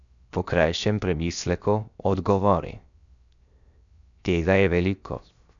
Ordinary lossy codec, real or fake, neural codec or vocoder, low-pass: none; fake; codec, 16 kHz, 0.7 kbps, FocalCodec; 7.2 kHz